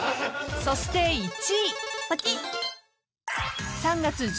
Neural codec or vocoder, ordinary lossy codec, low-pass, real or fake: none; none; none; real